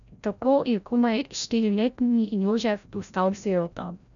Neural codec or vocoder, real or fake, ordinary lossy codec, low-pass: codec, 16 kHz, 0.5 kbps, FreqCodec, larger model; fake; none; 7.2 kHz